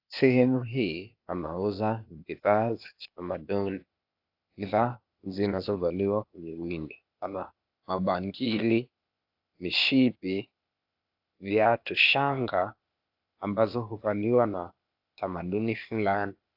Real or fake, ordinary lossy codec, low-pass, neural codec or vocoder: fake; AAC, 48 kbps; 5.4 kHz; codec, 16 kHz, 0.8 kbps, ZipCodec